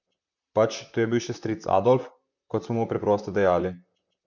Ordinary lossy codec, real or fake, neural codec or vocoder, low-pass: none; fake; vocoder, 44.1 kHz, 128 mel bands, Pupu-Vocoder; 7.2 kHz